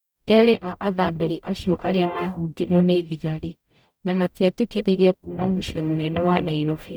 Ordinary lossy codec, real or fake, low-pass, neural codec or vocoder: none; fake; none; codec, 44.1 kHz, 0.9 kbps, DAC